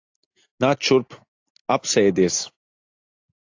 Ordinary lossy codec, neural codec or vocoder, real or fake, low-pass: AAC, 48 kbps; none; real; 7.2 kHz